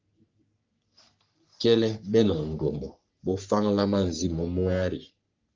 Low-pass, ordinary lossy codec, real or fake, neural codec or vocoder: 7.2 kHz; Opus, 24 kbps; fake; codec, 44.1 kHz, 3.4 kbps, Pupu-Codec